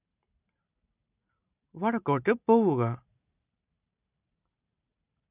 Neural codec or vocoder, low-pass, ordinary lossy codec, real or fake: none; 3.6 kHz; none; real